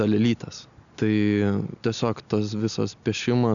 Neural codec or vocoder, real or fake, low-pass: none; real; 7.2 kHz